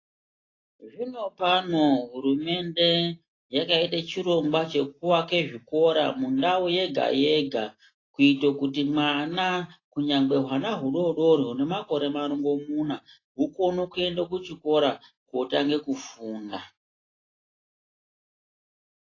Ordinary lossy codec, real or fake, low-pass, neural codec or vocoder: AAC, 32 kbps; real; 7.2 kHz; none